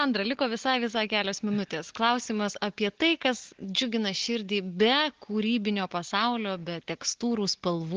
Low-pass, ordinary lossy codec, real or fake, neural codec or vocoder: 7.2 kHz; Opus, 16 kbps; real; none